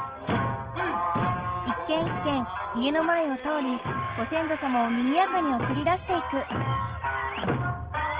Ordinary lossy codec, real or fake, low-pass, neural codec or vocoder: Opus, 16 kbps; real; 3.6 kHz; none